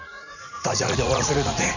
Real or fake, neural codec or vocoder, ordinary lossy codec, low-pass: fake; vocoder, 44.1 kHz, 80 mel bands, Vocos; none; 7.2 kHz